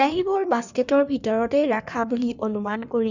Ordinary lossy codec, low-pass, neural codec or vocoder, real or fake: none; 7.2 kHz; codec, 16 kHz in and 24 kHz out, 1.1 kbps, FireRedTTS-2 codec; fake